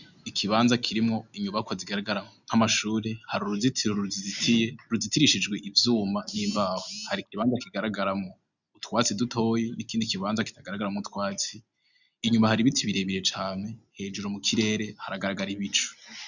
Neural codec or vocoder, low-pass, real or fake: none; 7.2 kHz; real